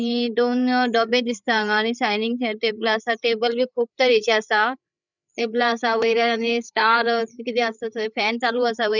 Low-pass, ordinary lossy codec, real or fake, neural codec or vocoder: 7.2 kHz; none; fake; codec, 16 kHz, 8 kbps, FreqCodec, larger model